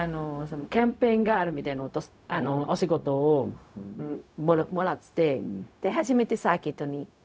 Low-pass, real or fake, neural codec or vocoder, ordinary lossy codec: none; fake; codec, 16 kHz, 0.4 kbps, LongCat-Audio-Codec; none